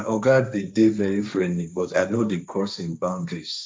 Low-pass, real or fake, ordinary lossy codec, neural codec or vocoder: none; fake; none; codec, 16 kHz, 1.1 kbps, Voila-Tokenizer